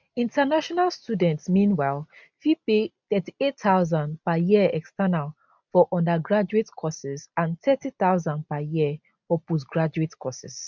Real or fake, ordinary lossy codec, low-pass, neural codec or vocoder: real; none; 7.2 kHz; none